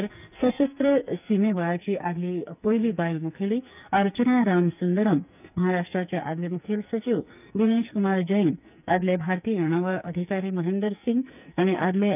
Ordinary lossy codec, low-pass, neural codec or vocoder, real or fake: none; 3.6 kHz; codec, 44.1 kHz, 2.6 kbps, SNAC; fake